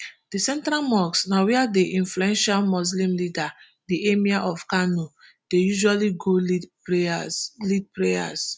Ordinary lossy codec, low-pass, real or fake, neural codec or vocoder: none; none; real; none